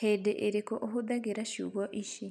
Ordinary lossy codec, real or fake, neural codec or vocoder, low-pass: none; real; none; none